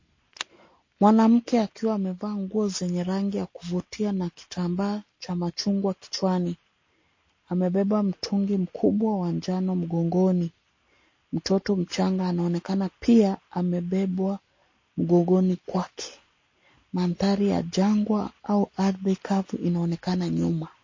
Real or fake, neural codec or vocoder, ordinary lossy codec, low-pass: real; none; MP3, 32 kbps; 7.2 kHz